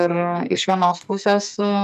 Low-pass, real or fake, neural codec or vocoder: 14.4 kHz; fake; codec, 44.1 kHz, 2.6 kbps, SNAC